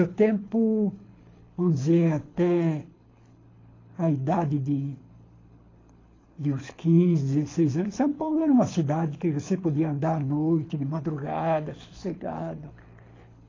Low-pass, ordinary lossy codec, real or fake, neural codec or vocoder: 7.2 kHz; AAC, 32 kbps; fake; codec, 24 kHz, 6 kbps, HILCodec